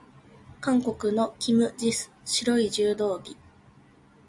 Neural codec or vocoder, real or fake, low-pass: none; real; 10.8 kHz